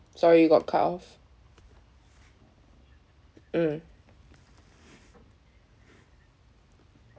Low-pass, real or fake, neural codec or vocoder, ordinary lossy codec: none; real; none; none